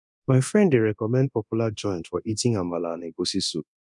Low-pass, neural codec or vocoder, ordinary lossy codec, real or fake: 10.8 kHz; codec, 24 kHz, 0.9 kbps, DualCodec; none; fake